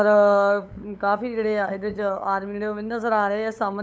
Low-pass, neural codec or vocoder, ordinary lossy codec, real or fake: none; codec, 16 kHz, 8 kbps, FunCodec, trained on LibriTTS, 25 frames a second; none; fake